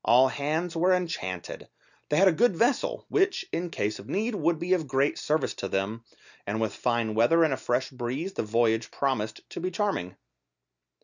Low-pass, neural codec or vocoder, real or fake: 7.2 kHz; none; real